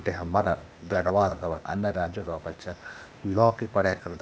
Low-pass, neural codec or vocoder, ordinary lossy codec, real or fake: none; codec, 16 kHz, 0.8 kbps, ZipCodec; none; fake